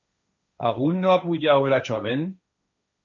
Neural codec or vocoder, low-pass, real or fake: codec, 16 kHz, 1.1 kbps, Voila-Tokenizer; 7.2 kHz; fake